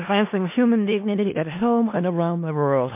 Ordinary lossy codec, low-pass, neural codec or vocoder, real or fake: MP3, 24 kbps; 3.6 kHz; codec, 16 kHz in and 24 kHz out, 0.4 kbps, LongCat-Audio-Codec, four codebook decoder; fake